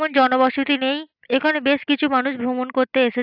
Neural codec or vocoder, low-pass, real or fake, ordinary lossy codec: none; 5.4 kHz; real; AAC, 48 kbps